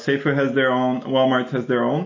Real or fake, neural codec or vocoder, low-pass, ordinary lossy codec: real; none; 7.2 kHz; MP3, 32 kbps